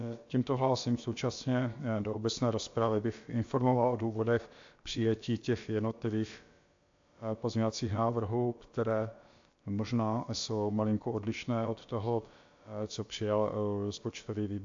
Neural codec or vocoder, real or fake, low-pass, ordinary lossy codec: codec, 16 kHz, about 1 kbps, DyCAST, with the encoder's durations; fake; 7.2 kHz; AAC, 48 kbps